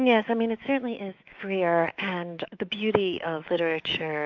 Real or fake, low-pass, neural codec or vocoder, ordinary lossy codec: fake; 7.2 kHz; autoencoder, 48 kHz, 128 numbers a frame, DAC-VAE, trained on Japanese speech; AAC, 48 kbps